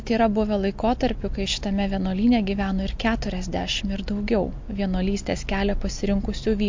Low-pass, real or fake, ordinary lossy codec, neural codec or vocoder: 7.2 kHz; real; MP3, 48 kbps; none